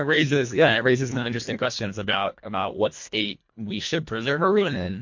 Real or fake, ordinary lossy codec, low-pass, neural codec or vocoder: fake; MP3, 48 kbps; 7.2 kHz; codec, 24 kHz, 1.5 kbps, HILCodec